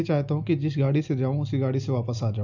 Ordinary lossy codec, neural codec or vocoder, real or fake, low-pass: none; none; real; 7.2 kHz